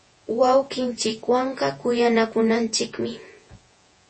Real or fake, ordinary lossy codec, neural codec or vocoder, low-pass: fake; MP3, 32 kbps; vocoder, 48 kHz, 128 mel bands, Vocos; 9.9 kHz